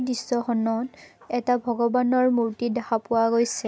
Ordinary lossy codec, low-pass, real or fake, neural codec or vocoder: none; none; real; none